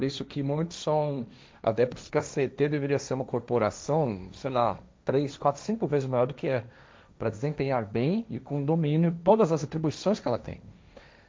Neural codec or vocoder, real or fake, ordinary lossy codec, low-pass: codec, 16 kHz, 1.1 kbps, Voila-Tokenizer; fake; none; none